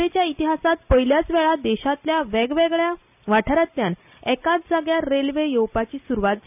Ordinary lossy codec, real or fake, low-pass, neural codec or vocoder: none; real; 3.6 kHz; none